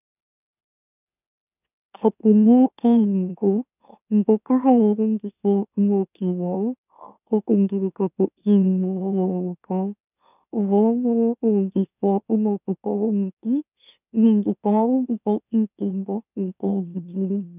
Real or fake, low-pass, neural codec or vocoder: fake; 3.6 kHz; autoencoder, 44.1 kHz, a latent of 192 numbers a frame, MeloTTS